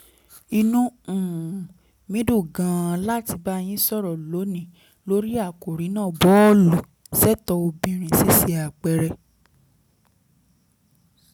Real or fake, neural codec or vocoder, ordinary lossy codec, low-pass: real; none; none; none